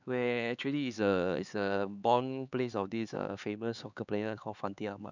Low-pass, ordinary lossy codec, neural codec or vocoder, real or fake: 7.2 kHz; none; codec, 16 kHz, 4 kbps, X-Codec, HuBERT features, trained on LibriSpeech; fake